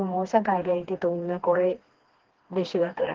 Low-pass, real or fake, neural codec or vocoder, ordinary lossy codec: 7.2 kHz; fake; codec, 16 kHz, 2 kbps, FreqCodec, smaller model; Opus, 16 kbps